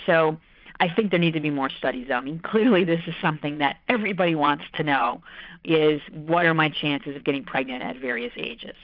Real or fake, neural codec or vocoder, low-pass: fake; vocoder, 22.05 kHz, 80 mel bands, Vocos; 5.4 kHz